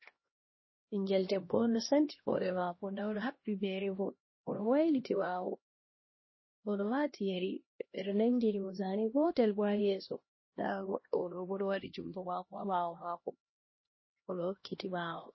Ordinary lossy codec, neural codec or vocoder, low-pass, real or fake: MP3, 24 kbps; codec, 16 kHz, 1 kbps, X-Codec, HuBERT features, trained on LibriSpeech; 7.2 kHz; fake